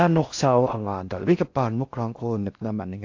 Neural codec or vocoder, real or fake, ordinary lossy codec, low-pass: codec, 16 kHz in and 24 kHz out, 0.6 kbps, FocalCodec, streaming, 4096 codes; fake; AAC, 48 kbps; 7.2 kHz